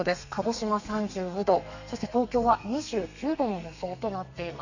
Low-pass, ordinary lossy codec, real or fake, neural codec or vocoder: 7.2 kHz; none; fake; codec, 32 kHz, 1.9 kbps, SNAC